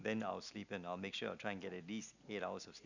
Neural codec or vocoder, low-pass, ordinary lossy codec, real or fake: none; 7.2 kHz; none; real